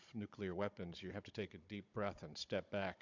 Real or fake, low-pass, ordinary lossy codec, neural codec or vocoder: fake; 7.2 kHz; MP3, 64 kbps; codec, 16 kHz, 16 kbps, FunCodec, trained on Chinese and English, 50 frames a second